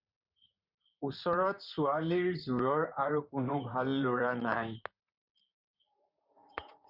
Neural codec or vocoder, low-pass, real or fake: vocoder, 44.1 kHz, 128 mel bands, Pupu-Vocoder; 5.4 kHz; fake